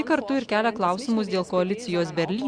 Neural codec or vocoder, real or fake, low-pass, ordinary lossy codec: none; real; 9.9 kHz; Opus, 64 kbps